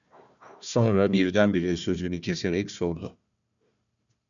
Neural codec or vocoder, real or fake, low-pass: codec, 16 kHz, 1 kbps, FunCodec, trained on Chinese and English, 50 frames a second; fake; 7.2 kHz